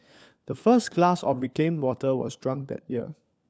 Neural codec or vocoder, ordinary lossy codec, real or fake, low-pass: codec, 16 kHz, 4 kbps, FunCodec, trained on LibriTTS, 50 frames a second; none; fake; none